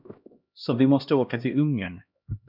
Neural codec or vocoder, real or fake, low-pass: codec, 16 kHz, 1 kbps, X-Codec, HuBERT features, trained on LibriSpeech; fake; 5.4 kHz